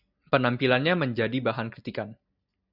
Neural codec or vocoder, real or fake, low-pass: none; real; 5.4 kHz